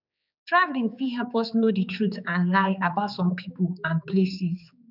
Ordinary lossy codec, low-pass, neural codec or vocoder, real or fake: none; 5.4 kHz; codec, 16 kHz, 4 kbps, X-Codec, HuBERT features, trained on general audio; fake